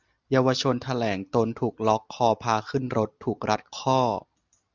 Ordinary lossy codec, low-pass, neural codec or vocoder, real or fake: Opus, 64 kbps; 7.2 kHz; none; real